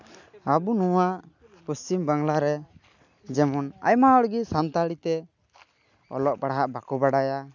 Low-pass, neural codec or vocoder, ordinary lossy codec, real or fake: 7.2 kHz; none; none; real